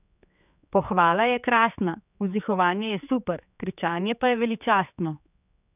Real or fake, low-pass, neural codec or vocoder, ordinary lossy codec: fake; 3.6 kHz; codec, 16 kHz, 4 kbps, X-Codec, HuBERT features, trained on general audio; none